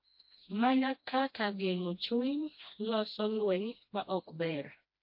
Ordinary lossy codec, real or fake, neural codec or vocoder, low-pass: MP3, 32 kbps; fake; codec, 16 kHz, 1 kbps, FreqCodec, smaller model; 5.4 kHz